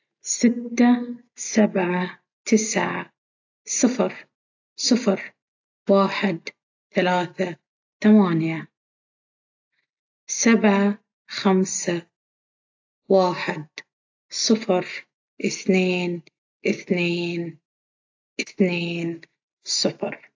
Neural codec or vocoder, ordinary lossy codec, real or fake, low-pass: none; AAC, 48 kbps; real; 7.2 kHz